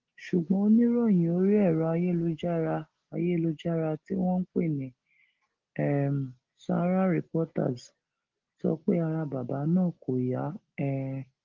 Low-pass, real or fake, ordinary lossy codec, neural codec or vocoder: 7.2 kHz; real; Opus, 16 kbps; none